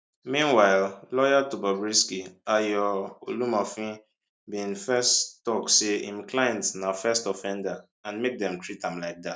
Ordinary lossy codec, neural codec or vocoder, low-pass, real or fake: none; none; none; real